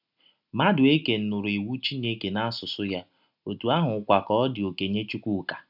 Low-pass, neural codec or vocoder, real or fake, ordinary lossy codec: 5.4 kHz; none; real; none